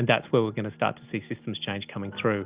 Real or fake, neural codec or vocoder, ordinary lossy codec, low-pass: real; none; Opus, 24 kbps; 3.6 kHz